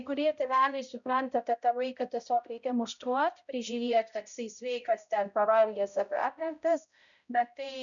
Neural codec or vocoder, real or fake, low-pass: codec, 16 kHz, 0.5 kbps, X-Codec, HuBERT features, trained on balanced general audio; fake; 7.2 kHz